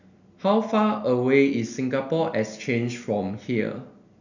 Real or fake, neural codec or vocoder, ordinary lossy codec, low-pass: real; none; none; 7.2 kHz